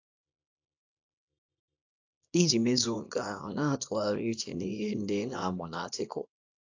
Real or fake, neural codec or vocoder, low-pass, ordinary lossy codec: fake; codec, 24 kHz, 0.9 kbps, WavTokenizer, small release; 7.2 kHz; AAC, 48 kbps